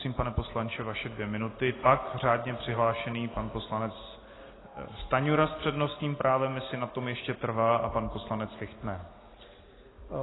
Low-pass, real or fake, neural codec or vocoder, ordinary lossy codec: 7.2 kHz; real; none; AAC, 16 kbps